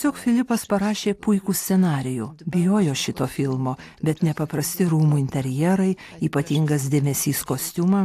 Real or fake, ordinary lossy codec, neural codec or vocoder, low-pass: real; AAC, 64 kbps; none; 14.4 kHz